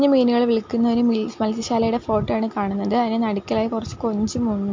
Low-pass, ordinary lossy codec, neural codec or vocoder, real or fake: 7.2 kHz; MP3, 48 kbps; none; real